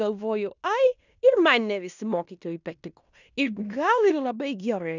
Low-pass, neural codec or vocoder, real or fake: 7.2 kHz; codec, 16 kHz in and 24 kHz out, 0.9 kbps, LongCat-Audio-Codec, fine tuned four codebook decoder; fake